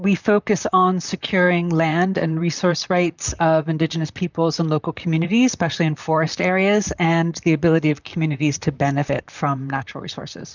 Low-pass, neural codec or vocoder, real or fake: 7.2 kHz; vocoder, 44.1 kHz, 128 mel bands, Pupu-Vocoder; fake